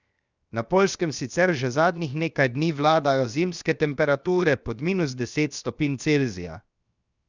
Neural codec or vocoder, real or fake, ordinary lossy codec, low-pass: codec, 16 kHz, 0.7 kbps, FocalCodec; fake; Opus, 64 kbps; 7.2 kHz